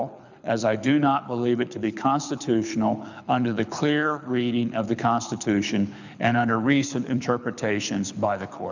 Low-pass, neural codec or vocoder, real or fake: 7.2 kHz; codec, 24 kHz, 6 kbps, HILCodec; fake